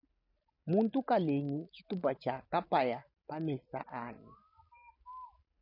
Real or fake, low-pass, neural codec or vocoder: real; 5.4 kHz; none